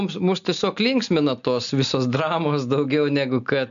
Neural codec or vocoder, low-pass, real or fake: none; 7.2 kHz; real